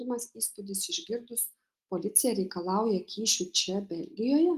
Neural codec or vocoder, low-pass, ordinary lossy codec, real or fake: none; 14.4 kHz; Opus, 24 kbps; real